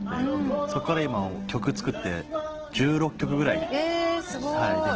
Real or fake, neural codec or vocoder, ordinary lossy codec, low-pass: real; none; Opus, 16 kbps; 7.2 kHz